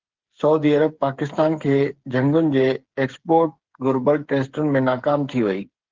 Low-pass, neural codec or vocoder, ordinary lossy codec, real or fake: 7.2 kHz; codec, 16 kHz, 8 kbps, FreqCodec, smaller model; Opus, 16 kbps; fake